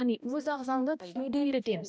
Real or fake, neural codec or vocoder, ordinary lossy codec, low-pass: fake; codec, 16 kHz, 1 kbps, X-Codec, HuBERT features, trained on balanced general audio; none; none